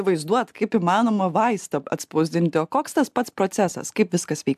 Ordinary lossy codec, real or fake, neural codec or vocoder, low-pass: AAC, 96 kbps; real; none; 14.4 kHz